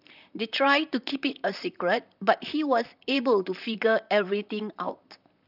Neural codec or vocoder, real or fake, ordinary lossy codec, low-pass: vocoder, 44.1 kHz, 128 mel bands, Pupu-Vocoder; fake; none; 5.4 kHz